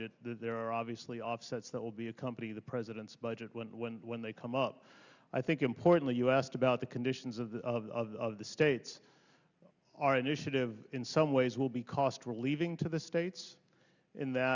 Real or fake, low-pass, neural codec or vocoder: real; 7.2 kHz; none